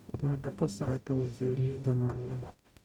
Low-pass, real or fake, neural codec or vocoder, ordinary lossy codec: 19.8 kHz; fake; codec, 44.1 kHz, 0.9 kbps, DAC; none